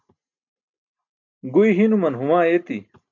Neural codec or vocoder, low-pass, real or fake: none; 7.2 kHz; real